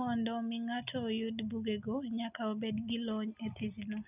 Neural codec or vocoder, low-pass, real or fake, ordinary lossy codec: none; 3.6 kHz; real; none